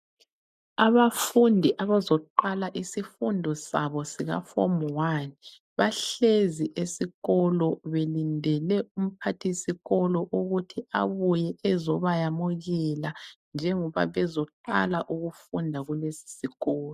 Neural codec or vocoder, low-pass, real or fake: none; 14.4 kHz; real